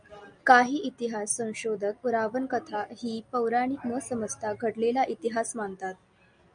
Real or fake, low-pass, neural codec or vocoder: real; 9.9 kHz; none